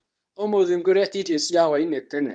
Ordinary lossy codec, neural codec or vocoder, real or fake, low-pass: none; codec, 24 kHz, 0.9 kbps, WavTokenizer, medium speech release version 2; fake; 9.9 kHz